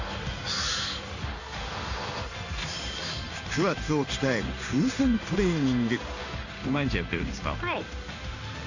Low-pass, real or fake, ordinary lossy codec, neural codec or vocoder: 7.2 kHz; fake; none; codec, 16 kHz in and 24 kHz out, 1 kbps, XY-Tokenizer